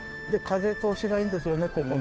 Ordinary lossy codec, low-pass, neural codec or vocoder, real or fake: none; none; codec, 16 kHz, 2 kbps, FunCodec, trained on Chinese and English, 25 frames a second; fake